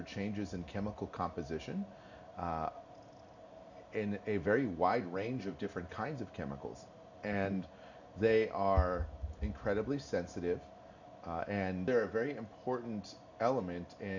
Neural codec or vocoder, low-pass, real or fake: none; 7.2 kHz; real